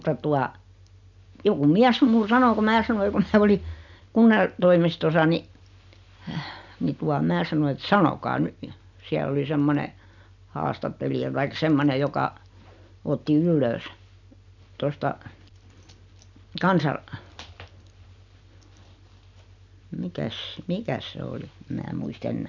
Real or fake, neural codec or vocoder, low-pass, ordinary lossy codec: real; none; 7.2 kHz; none